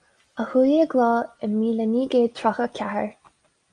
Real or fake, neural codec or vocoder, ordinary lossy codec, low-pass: real; none; Opus, 32 kbps; 9.9 kHz